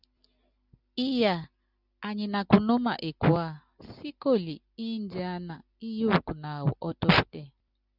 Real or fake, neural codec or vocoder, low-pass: real; none; 5.4 kHz